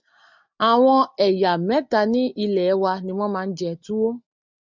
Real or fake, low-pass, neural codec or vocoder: real; 7.2 kHz; none